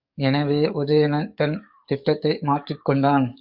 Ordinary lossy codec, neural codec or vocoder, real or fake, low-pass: Opus, 64 kbps; codec, 44.1 kHz, 7.8 kbps, DAC; fake; 5.4 kHz